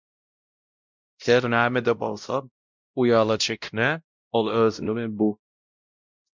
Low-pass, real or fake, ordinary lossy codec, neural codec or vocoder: 7.2 kHz; fake; MP3, 64 kbps; codec, 16 kHz, 0.5 kbps, X-Codec, WavLM features, trained on Multilingual LibriSpeech